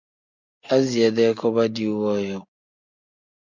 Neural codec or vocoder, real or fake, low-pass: none; real; 7.2 kHz